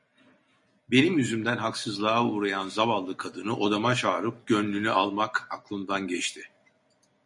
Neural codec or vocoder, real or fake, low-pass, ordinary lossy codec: none; real; 10.8 kHz; MP3, 48 kbps